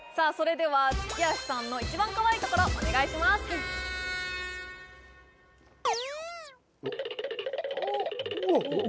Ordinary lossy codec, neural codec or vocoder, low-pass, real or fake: none; none; none; real